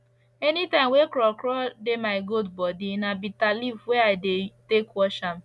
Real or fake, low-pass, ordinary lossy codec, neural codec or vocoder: real; none; none; none